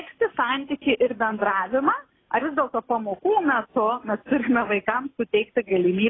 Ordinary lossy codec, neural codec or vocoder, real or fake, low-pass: AAC, 16 kbps; none; real; 7.2 kHz